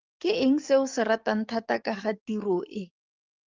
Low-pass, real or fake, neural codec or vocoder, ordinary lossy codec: 7.2 kHz; fake; codec, 44.1 kHz, 7.8 kbps, DAC; Opus, 24 kbps